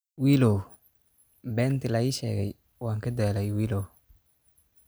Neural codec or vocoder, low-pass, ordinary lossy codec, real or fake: none; none; none; real